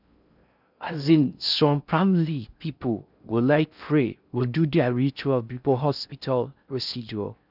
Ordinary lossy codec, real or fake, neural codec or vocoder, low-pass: none; fake; codec, 16 kHz in and 24 kHz out, 0.6 kbps, FocalCodec, streaming, 4096 codes; 5.4 kHz